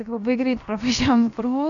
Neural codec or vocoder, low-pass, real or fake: codec, 16 kHz, about 1 kbps, DyCAST, with the encoder's durations; 7.2 kHz; fake